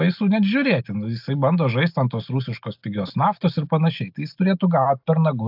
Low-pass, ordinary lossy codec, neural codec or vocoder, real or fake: 5.4 kHz; AAC, 48 kbps; none; real